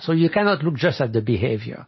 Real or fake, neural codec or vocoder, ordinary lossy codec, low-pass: real; none; MP3, 24 kbps; 7.2 kHz